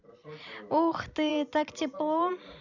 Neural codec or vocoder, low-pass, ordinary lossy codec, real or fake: vocoder, 44.1 kHz, 128 mel bands every 256 samples, BigVGAN v2; 7.2 kHz; none; fake